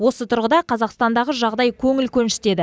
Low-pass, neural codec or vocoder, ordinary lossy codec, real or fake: none; none; none; real